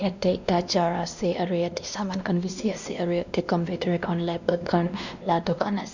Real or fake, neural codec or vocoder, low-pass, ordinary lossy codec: fake; codec, 16 kHz, 1 kbps, X-Codec, WavLM features, trained on Multilingual LibriSpeech; 7.2 kHz; none